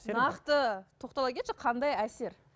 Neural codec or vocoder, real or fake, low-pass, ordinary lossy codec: none; real; none; none